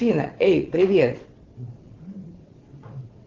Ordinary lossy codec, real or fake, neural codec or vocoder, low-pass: Opus, 16 kbps; fake; vocoder, 22.05 kHz, 80 mel bands, WaveNeXt; 7.2 kHz